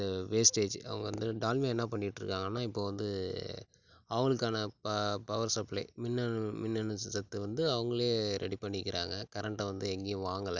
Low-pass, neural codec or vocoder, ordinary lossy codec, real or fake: 7.2 kHz; none; none; real